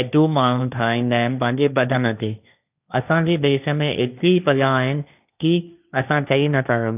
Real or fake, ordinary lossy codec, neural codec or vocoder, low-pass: fake; none; codec, 16 kHz, 1.1 kbps, Voila-Tokenizer; 3.6 kHz